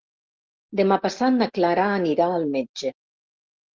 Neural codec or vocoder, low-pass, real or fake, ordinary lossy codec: none; 7.2 kHz; real; Opus, 16 kbps